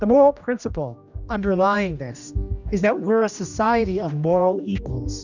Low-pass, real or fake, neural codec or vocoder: 7.2 kHz; fake; codec, 16 kHz, 1 kbps, X-Codec, HuBERT features, trained on general audio